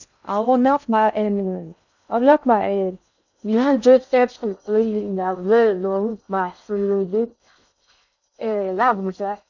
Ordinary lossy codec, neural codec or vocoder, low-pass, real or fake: none; codec, 16 kHz in and 24 kHz out, 0.6 kbps, FocalCodec, streaming, 2048 codes; 7.2 kHz; fake